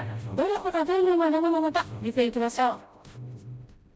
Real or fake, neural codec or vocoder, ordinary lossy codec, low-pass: fake; codec, 16 kHz, 0.5 kbps, FreqCodec, smaller model; none; none